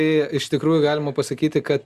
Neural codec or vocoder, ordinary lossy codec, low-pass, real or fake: none; Opus, 64 kbps; 14.4 kHz; real